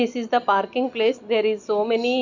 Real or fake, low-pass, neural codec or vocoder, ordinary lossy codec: real; 7.2 kHz; none; none